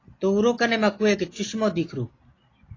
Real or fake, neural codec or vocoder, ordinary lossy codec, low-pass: real; none; AAC, 32 kbps; 7.2 kHz